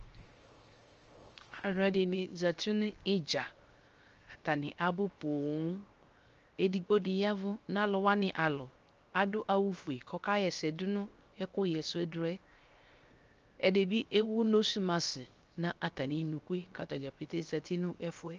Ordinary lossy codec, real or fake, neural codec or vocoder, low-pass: Opus, 24 kbps; fake; codec, 16 kHz, 0.7 kbps, FocalCodec; 7.2 kHz